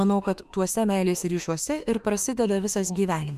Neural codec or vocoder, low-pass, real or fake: autoencoder, 48 kHz, 32 numbers a frame, DAC-VAE, trained on Japanese speech; 14.4 kHz; fake